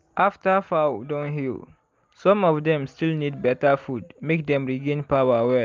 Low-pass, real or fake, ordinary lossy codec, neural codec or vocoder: 7.2 kHz; real; Opus, 32 kbps; none